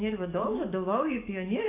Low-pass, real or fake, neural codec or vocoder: 3.6 kHz; fake; codec, 44.1 kHz, 7.8 kbps, DAC